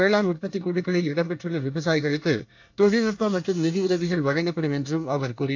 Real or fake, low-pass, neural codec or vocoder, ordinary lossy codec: fake; 7.2 kHz; codec, 24 kHz, 1 kbps, SNAC; AAC, 48 kbps